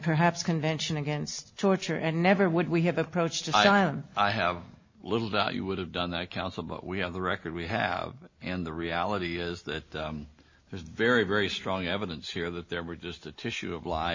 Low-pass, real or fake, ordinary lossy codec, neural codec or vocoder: 7.2 kHz; real; MP3, 32 kbps; none